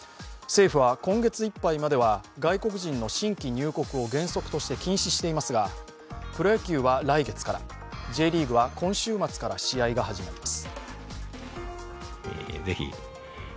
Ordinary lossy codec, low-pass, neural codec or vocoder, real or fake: none; none; none; real